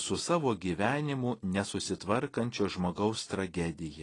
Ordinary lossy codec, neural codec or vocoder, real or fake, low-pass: AAC, 32 kbps; none; real; 10.8 kHz